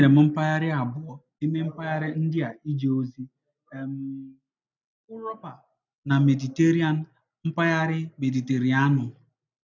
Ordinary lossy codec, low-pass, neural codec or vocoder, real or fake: none; 7.2 kHz; none; real